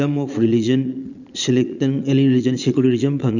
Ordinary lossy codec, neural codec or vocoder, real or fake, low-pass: none; vocoder, 22.05 kHz, 80 mel bands, Vocos; fake; 7.2 kHz